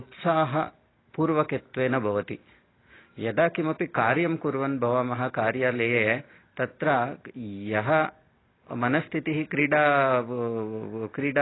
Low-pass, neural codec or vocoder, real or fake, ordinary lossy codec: 7.2 kHz; none; real; AAC, 16 kbps